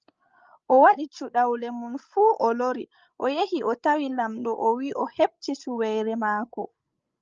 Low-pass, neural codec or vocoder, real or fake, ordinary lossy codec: 7.2 kHz; codec, 16 kHz, 16 kbps, FreqCodec, larger model; fake; Opus, 24 kbps